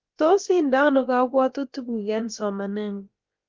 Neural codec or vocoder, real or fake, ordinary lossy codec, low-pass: codec, 16 kHz, about 1 kbps, DyCAST, with the encoder's durations; fake; Opus, 32 kbps; 7.2 kHz